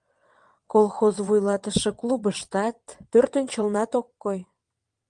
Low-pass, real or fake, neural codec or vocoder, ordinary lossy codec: 9.9 kHz; fake; vocoder, 22.05 kHz, 80 mel bands, WaveNeXt; Opus, 32 kbps